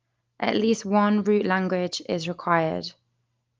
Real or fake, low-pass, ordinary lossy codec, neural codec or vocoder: real; 7.2 kHz; Opus, 32 kbps; none